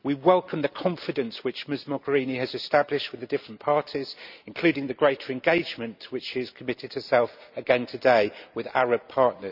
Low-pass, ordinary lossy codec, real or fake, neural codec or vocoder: 5.4 kHz; none; real; none